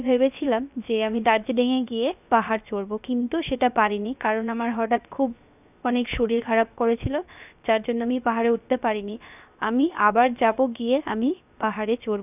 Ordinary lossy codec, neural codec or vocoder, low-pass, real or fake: none; codec, 16 kHz, 0.7 kbps, FocalCodec; 3.6 kHz; fake